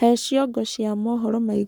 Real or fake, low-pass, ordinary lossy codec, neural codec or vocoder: fake; none; none; codec, 44.1 kHz, 7.8 kbps, Pupu-Codec